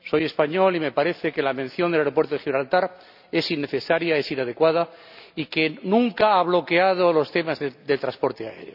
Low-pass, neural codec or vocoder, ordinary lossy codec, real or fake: 5.4 kHz; none; none; real